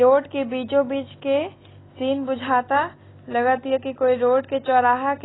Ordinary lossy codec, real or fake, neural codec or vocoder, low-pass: AAC, 16 kbps; real; none; 7.2 kHz